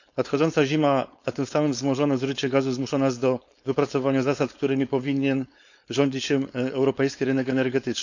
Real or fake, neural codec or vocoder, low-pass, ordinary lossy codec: fake; codec, 16 kHz, 4.8 kbps, FACodec; 7.2 kHz; none